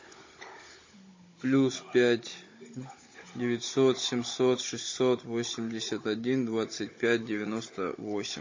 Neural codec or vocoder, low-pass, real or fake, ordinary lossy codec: codec, 16 kHz, 16 kbps, FunCodec, trained on Chinese and English, 50 frames a second; 7.2 kHz; fake; MP3, 32 kbps